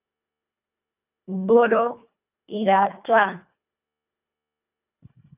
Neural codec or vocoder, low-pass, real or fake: codec, 24 kHz, 1.5 kbps, HILCodec; 3.6 kHz; fake